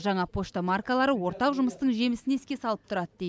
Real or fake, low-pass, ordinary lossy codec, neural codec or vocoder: real; none; none; none